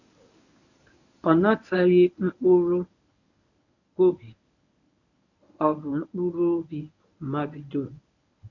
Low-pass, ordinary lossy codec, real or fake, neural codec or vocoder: 7.2 kHz; none; fake; codec, 24 kHz, 0.9 kbps, WavTokenizer, medium speech release version 1